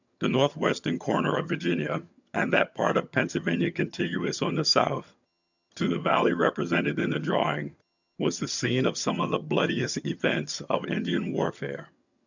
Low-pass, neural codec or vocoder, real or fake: 7.2 kHz; vocoder, 22.05 kHz, 80 mel bands, HiFi-GAN; fake